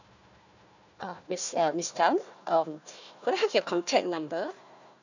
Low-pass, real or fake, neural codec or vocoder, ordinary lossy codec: 7.2 kHz; fake; codec, 16 kHz, 1 kbps, FunCodec, trained on Chinese and English, 50 frames a second; none